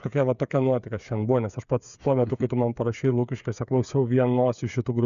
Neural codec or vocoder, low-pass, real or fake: codec, 16 kHz, 8 kbps, FreqCodec, smaller model; 7.2 kHz; fake